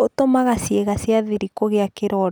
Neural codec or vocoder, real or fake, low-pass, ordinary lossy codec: none; real; none; none